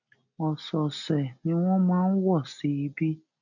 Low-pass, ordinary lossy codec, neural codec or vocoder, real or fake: 7.2 kHz; none; none; real